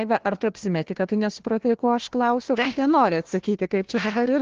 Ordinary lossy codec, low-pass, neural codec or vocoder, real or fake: Opus, 16 kbps; 7.2 kHz; codec, 16 kHz, 1 kbps, FunCodec, trained on Chinese and English, 50 frames a second; fake